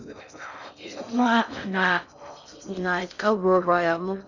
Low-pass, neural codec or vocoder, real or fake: 7.2 kHz; codec, 16 kHz in and 24 kHz out, 0.6 kbps, FocalCodec, streaming, 4096 codes; fake